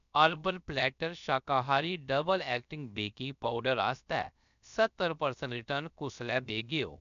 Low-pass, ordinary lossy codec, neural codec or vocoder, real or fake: 7.2 kHz; none; codec, 16 kHz, about 1 kbps, DyCAST, with the encoder's durations; fake